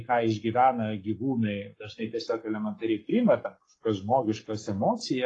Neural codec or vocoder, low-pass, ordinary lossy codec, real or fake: codec, 44.1 kHz, 7.8 kbps, Pupu-Codec; 10.8 kHz; AAC, 32 kbps; fake